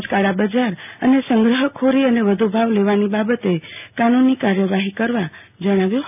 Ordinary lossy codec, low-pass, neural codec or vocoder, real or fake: none; 3.6 kHz; none; real